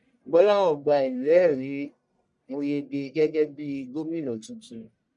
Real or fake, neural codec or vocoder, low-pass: fake; codec, 44.1 kHz, 1.7 kbps, Pupu-Codec; 10.8 kHz